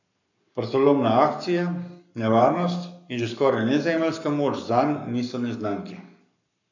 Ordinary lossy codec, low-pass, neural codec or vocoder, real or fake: none; 7.2 kHz; codec, 44.1 kHz, 7.8 kbps, Pupu-Codec; fake